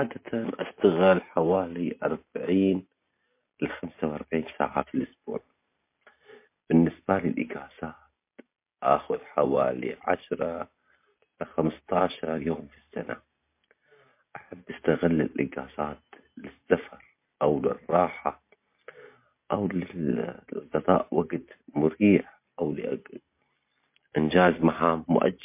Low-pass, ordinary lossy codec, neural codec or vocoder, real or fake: 3.6 kHz; MP3, 24 kbps; none; real